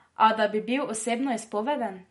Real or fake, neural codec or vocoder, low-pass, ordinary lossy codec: real; none; 19.8 kHz; MP3, 48 kbps